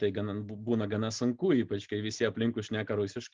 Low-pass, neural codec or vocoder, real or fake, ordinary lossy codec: 7.2 kHz; none; real; Opus, 32 kbps